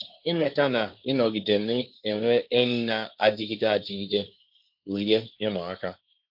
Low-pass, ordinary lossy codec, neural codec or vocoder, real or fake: 5.4 kHz; MP3, 48 kbps; codec, 16 kHz, 1.1 kbps, Voila-Tokenizer; fake